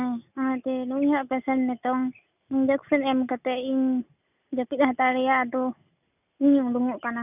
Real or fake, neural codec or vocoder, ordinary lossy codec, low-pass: real; none; none; 3.6 kHz